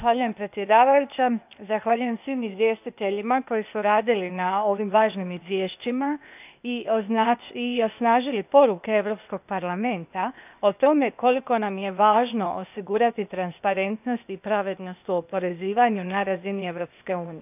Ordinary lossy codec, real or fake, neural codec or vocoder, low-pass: none; fake; codec, 16 kHz, 0.8 kbps, ZipCodec; 3.6 kHz